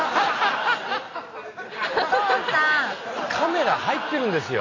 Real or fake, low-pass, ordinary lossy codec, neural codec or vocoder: real; 7.2 kHz; AAC, 32 kbps; none